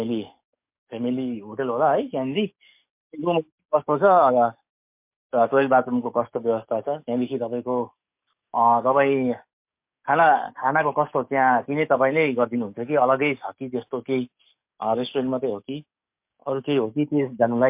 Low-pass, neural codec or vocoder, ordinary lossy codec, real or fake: 3.6 kHz; codec, 44.1 kHz, 7.8 kbps, DAC; MP3, 32 kbps; fake